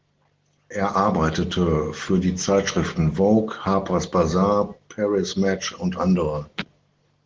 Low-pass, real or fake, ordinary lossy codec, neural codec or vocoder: 7.2 kHz; real; Opus, 16 kbps; none